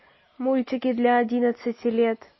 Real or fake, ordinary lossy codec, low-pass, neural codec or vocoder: real; MP3, 24 kbps; 7.2 kHz; none